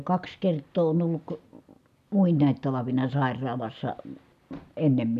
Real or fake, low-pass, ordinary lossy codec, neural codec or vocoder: real; 14.4 kHz; none; none